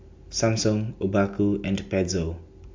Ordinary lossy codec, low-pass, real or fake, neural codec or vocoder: MP3, 64 kbps; 7.2 kHz; real; none